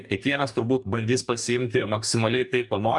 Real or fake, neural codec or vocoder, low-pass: fake; codec, 44.1 kHz, 2.6 kbps, DAC; 10.8 kHz